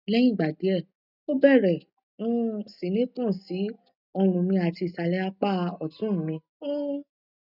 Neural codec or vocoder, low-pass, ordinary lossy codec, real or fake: none; 5.4 kHz; none; real